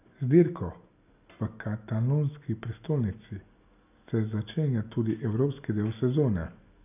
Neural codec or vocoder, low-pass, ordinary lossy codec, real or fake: none; 3.6 kHz; none; real